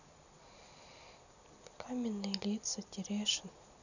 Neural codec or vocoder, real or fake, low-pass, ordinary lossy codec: none; real; 7.2 kHz; none